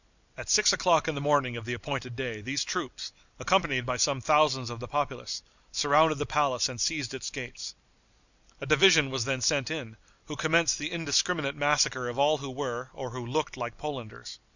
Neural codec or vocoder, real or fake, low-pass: none; real; 7.2 kHz